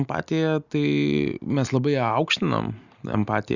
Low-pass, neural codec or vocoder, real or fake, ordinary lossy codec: 7.2 kHz; none; real; Opus, 64 kbps